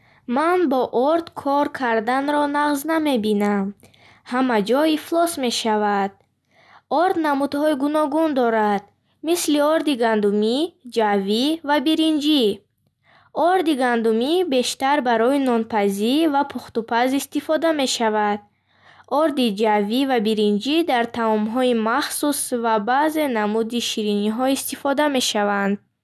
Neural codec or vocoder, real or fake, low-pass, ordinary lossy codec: none; real; none; none